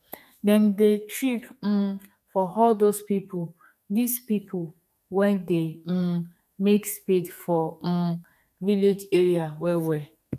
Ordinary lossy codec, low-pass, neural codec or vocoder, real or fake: none; 14.4 kHz; codec, 32 kHz, 1.9 kbps, SNAC; fake